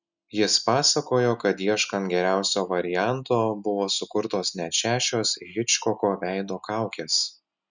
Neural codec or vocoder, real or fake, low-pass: none; real; 7.2 kHz